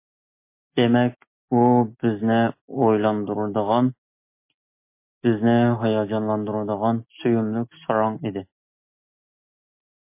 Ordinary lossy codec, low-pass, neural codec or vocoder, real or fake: MP3, 24 kbps; 3.6 kHz; none; real